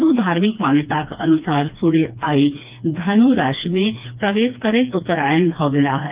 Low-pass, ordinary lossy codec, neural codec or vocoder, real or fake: 3.6 kHz; Opus, 32 kbps; codec, 16 kHz, 2 kbps, FreqCodec, smaller model; fake